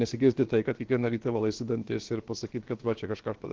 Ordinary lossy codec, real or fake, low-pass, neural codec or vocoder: Opus, 16 kbps; fake; 7.2 kHz; codec, 16 kHz, about 1 kbps, DyCAST, with the encoder's durations